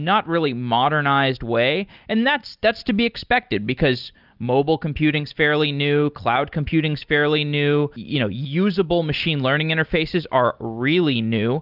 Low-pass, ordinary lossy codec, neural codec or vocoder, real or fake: 5.4 kHz; Opus, 24 kbps; none; real